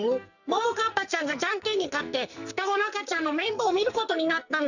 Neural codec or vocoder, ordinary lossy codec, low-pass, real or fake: codec, 44.1 kHz, 2.6 kbps, SNAC; none; 7.2 kHz; fake